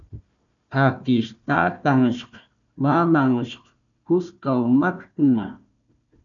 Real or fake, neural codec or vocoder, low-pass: fake; codec, 16 kHz, 1 kbps, FunCodec, trained on Chinese and English, 50 frames a second; 7.2 kHz